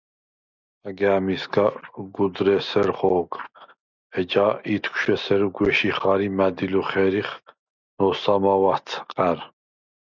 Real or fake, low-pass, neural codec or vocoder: real; 7.2 kHz; none